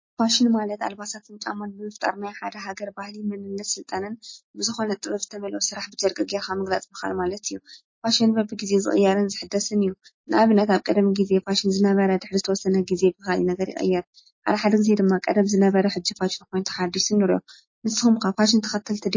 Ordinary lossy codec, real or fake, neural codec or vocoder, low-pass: MP3, 32 kbps; real; none; 7.2 kHz